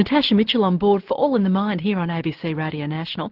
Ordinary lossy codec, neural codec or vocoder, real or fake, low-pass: Opus, 16 kbps; none; real; 5.4 kHz